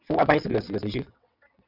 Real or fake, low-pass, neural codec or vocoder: fake; 5.4 kHz; vocoder, 22.05 kHz, 80 mel bands, WaveNeXt